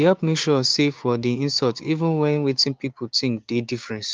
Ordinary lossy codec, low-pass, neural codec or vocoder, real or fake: Opus, 32 kbps; 7.2 kHz; codec, 16 kHz, 6 kbps, DAC; fake